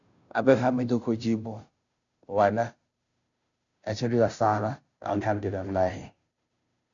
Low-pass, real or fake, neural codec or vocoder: 7.2 kHz; fake; codec, 16 kHz, 0.5 kbps, FunCodec, trained on Chinese and English, 25 frames a second